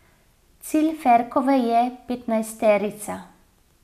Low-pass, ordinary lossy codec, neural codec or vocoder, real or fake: 14.4 kHz; none; none; real